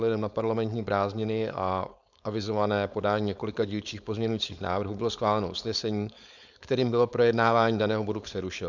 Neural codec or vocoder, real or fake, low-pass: codec, 16 kHz, 4.8 kbps, FACodec; fake; 7.2 kHz